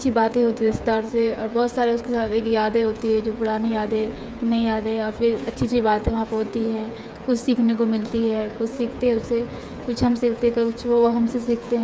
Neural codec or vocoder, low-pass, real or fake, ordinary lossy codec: codec, 16 kHz, 8 kbps, FreqCodec, smaller model; none; fake; none